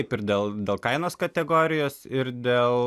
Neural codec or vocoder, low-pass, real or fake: none; 14.4 kHz; real